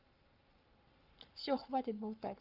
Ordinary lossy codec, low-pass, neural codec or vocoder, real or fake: Opus, 24 kbps; 5.4 kHz; codec, 44.1 kHz, 7.8 kbps, Pupu-Codec; fake